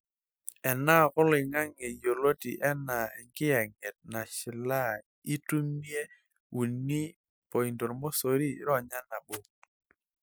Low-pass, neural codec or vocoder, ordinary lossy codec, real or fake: none; none; none; real